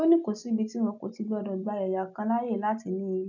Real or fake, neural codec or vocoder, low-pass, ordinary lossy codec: real; none; 7.2 kHz; none